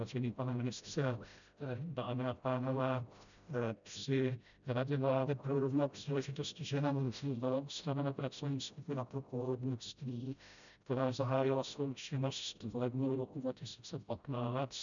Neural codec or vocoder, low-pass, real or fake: codec, 16 kHz, 0.5 kbps, FreqCodec, smaller model; 7.2 kHz; fake